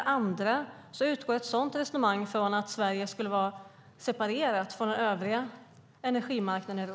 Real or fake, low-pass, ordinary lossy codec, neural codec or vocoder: real; none; none; none